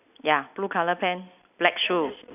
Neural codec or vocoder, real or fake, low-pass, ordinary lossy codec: none; real; 3.6 kHz; none